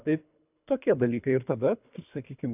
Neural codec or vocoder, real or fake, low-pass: codec, 24 kHz, 3 kbps, HILCodec; fake; 3.6 kHz